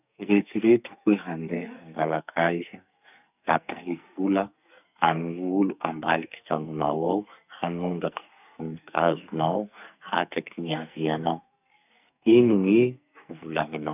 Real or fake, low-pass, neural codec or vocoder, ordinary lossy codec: fake; 3.6 kHz; codec, 44.1 kHz, 2.6 kbps, SNAC; none